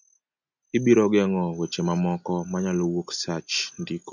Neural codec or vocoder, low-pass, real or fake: none; 7.2 kHz; real